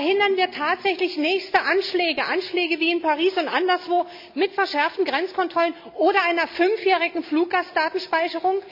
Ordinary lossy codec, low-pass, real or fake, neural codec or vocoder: MP3, 48 kbps; 5.4 kHz; real; none